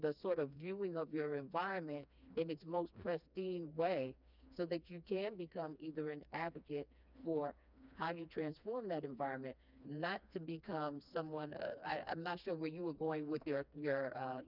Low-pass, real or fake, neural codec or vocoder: 5.4 kHz; fake; codec, 16 kHz, 2 kbps, FreqCodec, smaller model